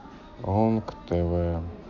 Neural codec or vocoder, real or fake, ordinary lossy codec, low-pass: none; real; none; 7.2 kHz